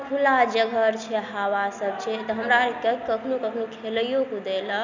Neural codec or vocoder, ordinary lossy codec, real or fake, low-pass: none; none; real; 7.2 kHz